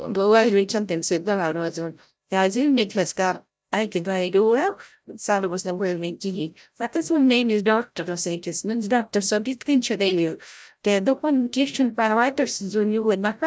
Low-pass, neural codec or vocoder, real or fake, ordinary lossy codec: none; codec, 16 kHz, 0.5 kbps, FreqCodec, larger model; fake; none